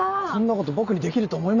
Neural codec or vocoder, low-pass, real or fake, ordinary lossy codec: none; 7.2 kHz; real; none